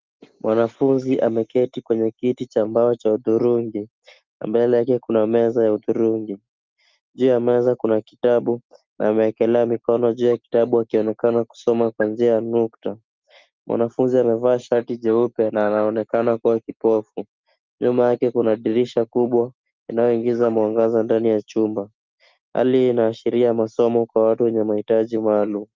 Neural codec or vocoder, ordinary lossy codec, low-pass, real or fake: none; Opus, 24 kbps; 7.2 kHz; real